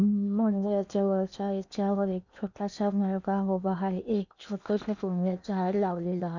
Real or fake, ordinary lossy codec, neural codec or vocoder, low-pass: fake; none; codec, 16 kHz in and 24 kHz out, 0.8 kbps, FocalCodec, streaming, 65536 codes; 7.2 kHz